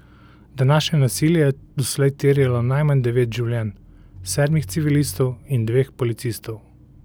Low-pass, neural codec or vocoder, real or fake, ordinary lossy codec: none; none; real; none